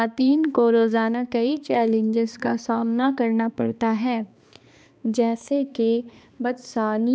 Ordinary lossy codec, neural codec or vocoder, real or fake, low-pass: none; codec, 16 kHz, 2 kbps, X-Codec, HuBERT features, trained on balanced general audio; fake; none